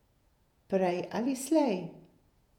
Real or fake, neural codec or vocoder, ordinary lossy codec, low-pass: fake; vocoder, 48 kHz, 128 mel bands, Vocos; none; 19.8 kHz